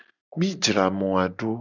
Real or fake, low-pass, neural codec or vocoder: real; 7.2 kHz; none